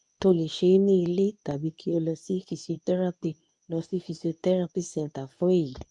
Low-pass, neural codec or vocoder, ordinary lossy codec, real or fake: 10.8 kHz; codec, 24 kHz, 0.9 kbps, WavTokenizer, medium speech release version 2; none; fake